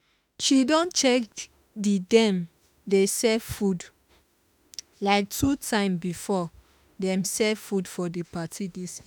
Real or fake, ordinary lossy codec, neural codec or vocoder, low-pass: fake; none; autoencoder, 48 kHz, 32 numbers a frame, DAC-VAE, trained on Japanese speech; none